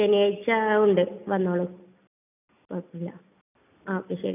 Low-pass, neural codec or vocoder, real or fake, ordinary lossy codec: 3.6 kHz; none; real; none